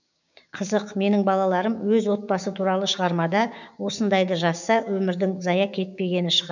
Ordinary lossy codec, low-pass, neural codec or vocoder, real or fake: none; 7.2 kHz; codec, 16 kHz, 6 kbps, DAC; fake